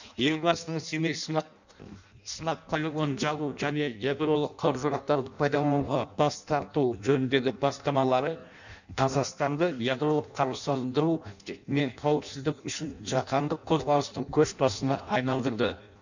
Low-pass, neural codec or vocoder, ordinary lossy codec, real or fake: 7.2 kHz; codec, 16 kHz in and 24 kHz out, 0.6 kbps, FireRedTTS-2 codec; none; fake